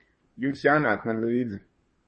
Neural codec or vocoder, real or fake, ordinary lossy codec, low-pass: codec, 24 kHz, 1 kbps, SNAC; fake; MP3, 32 kbps; 10.8 kHz